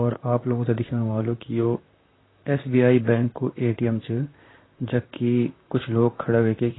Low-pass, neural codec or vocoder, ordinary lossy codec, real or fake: 7.2 kHz; vocoder, 44.1 kHz, 128 mel bands, Pupu-Vocoder; AAC, 16 kbps; fake